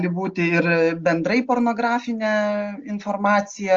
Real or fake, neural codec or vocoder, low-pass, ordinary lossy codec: real; none; 7.2 kHz; Opus, 32 kbps